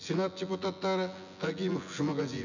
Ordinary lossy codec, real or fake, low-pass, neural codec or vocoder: none; fake; 7.2 kHz; vocoder, 24 kHz, 100 mel bands, Vocos